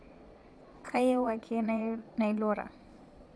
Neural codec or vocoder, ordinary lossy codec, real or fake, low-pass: vocoder, 22.05 kHz, 80 mel bands, Vocos; none; fake; none